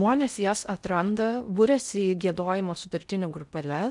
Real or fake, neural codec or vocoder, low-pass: fake; codec, 16 kHz in and 24 kHz out, 0.6 kbps, FocalCodec, streaming, 4096 codes; 10.8 kHz